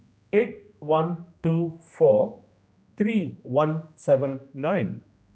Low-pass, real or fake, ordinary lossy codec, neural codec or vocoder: none; fake; none; codec, 16 kHz, 1 kbps, X-Codec, HuBERT features, trained on general audio